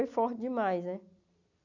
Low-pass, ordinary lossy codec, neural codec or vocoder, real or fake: 7.2 kHz; none; none; real